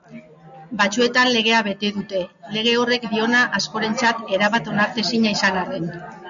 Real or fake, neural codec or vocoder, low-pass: real; none; 7.2 kHz